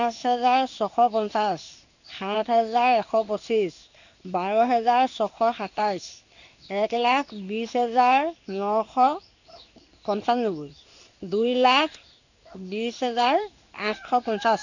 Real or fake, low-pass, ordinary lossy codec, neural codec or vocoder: fake; 7.2 kHz; none; codec, 16 kHz in and 24 kHz out, 1 kbps, XY-Tokenizer